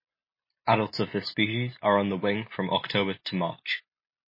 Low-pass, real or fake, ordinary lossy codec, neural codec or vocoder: 5.4 kHz; real; MP3, 24 kbps; none